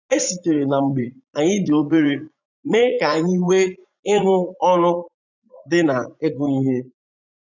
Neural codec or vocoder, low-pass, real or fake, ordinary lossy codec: vocoder, 44.1 kHz, 80 mel bands, Vocos; 7.2 kHz; fake; none